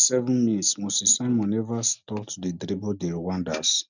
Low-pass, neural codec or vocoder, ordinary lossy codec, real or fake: 7.2 kHz; none; none; real